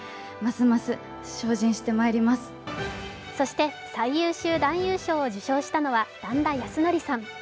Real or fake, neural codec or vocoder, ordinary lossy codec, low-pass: real; none; none; none